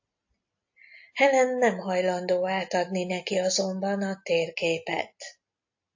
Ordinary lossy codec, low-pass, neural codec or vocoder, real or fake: AAC, 48 kbps; 7.2 kHz; none; real